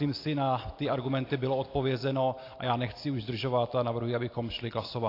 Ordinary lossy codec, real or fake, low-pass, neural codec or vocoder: AAC, 32 kbps; real; 5.4 kHz; none